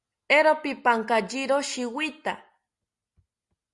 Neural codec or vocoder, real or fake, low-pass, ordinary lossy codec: none; real; 10.8 kHz; Opus, 64 kbps